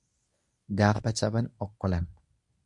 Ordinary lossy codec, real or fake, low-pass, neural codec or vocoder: MP3, 64 kbps; fake; 10.8 kHz; codec, 24 kHz, 0.9 kbps, WavTokenizer, medium speech release version 1